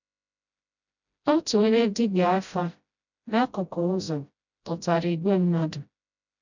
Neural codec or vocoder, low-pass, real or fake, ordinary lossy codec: codec, 16 kHz, 0.5 kbps, FreqCodec, smaller model; 7.2 kHz; fake; none